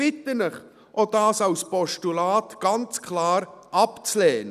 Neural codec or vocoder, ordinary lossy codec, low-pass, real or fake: none; none; 14.4 kHz; real